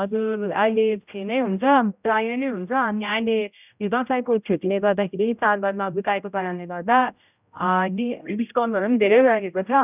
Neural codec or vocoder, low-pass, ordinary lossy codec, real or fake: codec, 16 kHz, 0.5 kbps, X-Codec, HuBERT features, trained on general audio; 3.6 kHz; none; fake